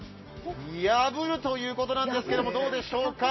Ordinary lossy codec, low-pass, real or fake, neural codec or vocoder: MP3, 24 kbps; 7.2 kHz; real; none